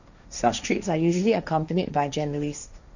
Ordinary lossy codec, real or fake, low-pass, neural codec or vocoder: none; fake; 7.2 kHz; codec, 16 kHz, 1.1 kbps, Voila-Tokenizer